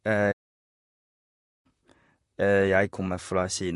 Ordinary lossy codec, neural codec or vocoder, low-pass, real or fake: MP3, 48 kbps; vocoder, 44.1 kHz, 128 mel bands, Pupu-Vocoder; 14.4 kHz; fake